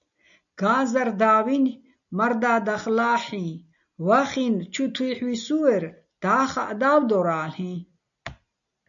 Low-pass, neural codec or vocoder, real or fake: 7.2 kHz; none; real